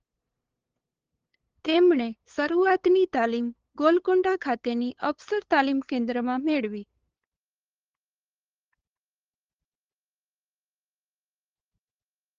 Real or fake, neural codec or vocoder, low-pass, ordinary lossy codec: fake; codec, 16 kHz, 8 kbps, FunCodec, trained on LibriTTS, 25 frames a second; 7.2 kHz; Opus, 16 kbps